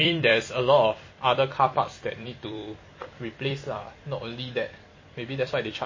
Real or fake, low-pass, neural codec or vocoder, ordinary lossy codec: fake; 7.2 kHz; vocoder, 44.1 kHz, 128 mel bands every 256 samples, BigVGAN v2; MP3, 32 kbps